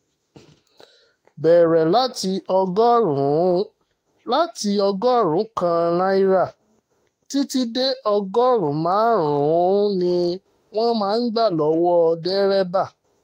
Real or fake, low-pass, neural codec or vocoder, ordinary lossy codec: fake; 19.8 kHz; autoencoder, 48 kHz, 32 numbers a frame, DAC-VAE, trained on Japanese speech; AAC, 48 kbps